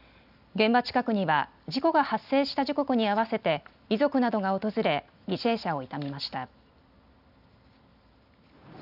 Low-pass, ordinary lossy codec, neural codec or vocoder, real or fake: 5.4 kHz; none; none; real